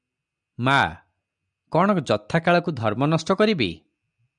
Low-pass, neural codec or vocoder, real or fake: 9.9 kHz; none; real